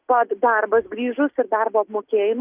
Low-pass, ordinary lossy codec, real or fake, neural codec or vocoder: 3.6 kHz; Opus, 24 kbps; real; none